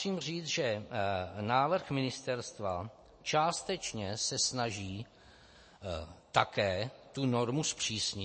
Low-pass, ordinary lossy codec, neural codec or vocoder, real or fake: 9.9 kHz; MP3, 32 kbps; none; real